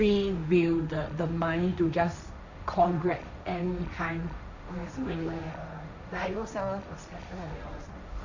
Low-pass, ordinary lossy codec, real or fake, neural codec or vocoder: 7.2 kHz; none; fake; codec, 16 kHz, 1.1 kbps, Voila-Tokenizer